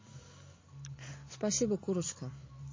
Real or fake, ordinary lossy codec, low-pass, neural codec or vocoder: real; MP3, 32 kbps; 7.2 kHz; none